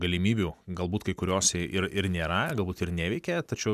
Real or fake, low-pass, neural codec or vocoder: real; 14.4 kHz; none